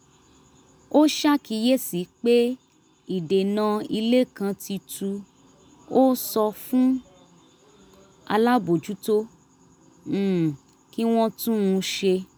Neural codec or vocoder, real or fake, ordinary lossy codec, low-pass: none; real; none; none